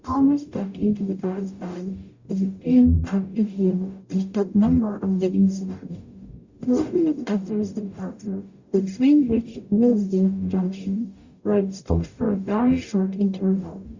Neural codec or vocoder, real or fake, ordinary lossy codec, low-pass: codec, 44.1 kHz, 0.9 kbps, DAC; fake; Opus, 64 kbps; 7.2 kHz